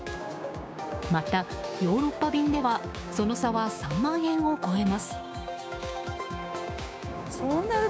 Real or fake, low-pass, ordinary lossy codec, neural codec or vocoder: fake; none; none; codec, 16 kHz, 6 kbps, DAC